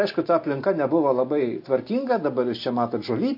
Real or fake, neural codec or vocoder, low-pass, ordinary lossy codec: real; none; 5.4 kHz; MP3, 32 kbps